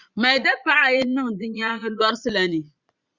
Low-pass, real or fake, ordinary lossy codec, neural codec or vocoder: 7.2 kHz; fake; Opus, 64 kbps; vocoder, 22.05 kHz, 80 mel bands, Vocos